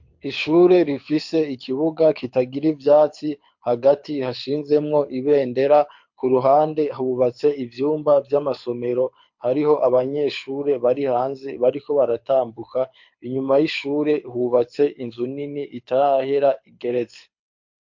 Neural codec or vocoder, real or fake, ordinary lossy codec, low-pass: codec, 24 kHz, 6 kbps, HILCodec; fake; MP3, 48 kbps; 7.2 kHz